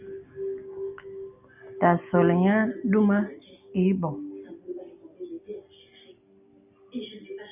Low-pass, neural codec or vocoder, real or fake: 3.6 kHz; none; real